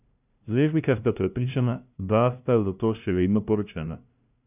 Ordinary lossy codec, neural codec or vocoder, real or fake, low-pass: none; codec, 16 kHz, 0.5 kbps, FunCodec, trained on LibriTTS, 25 frames a second; fake; 3.6 kHz